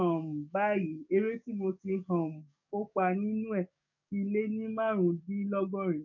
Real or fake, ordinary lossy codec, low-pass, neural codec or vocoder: fake; none; 7.2 kHz; codec, 44.1 kHz, 7.8 kbps, DAC